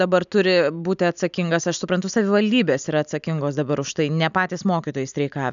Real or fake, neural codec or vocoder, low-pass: real; none; 7.2 kHz